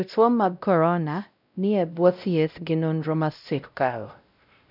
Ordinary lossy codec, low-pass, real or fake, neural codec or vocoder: none; 5.4 kHz; fake; codec, 16 kHz, 0.5 kbps, X-Codec, WavLM features, trained on Multilingual LibriSpeech